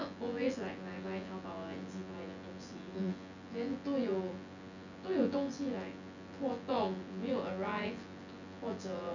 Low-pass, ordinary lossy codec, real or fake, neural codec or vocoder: 7.2 kHz; none; fake; vocoder, 24 kHz, 100 mel bands, Vocos